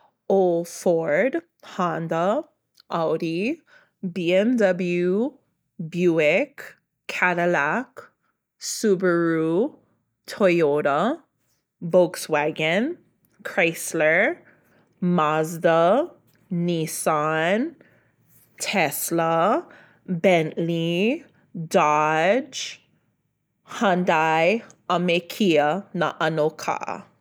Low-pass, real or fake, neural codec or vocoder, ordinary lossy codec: none; real; none; none